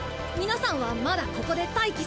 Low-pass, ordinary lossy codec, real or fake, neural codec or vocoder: none; none; real; none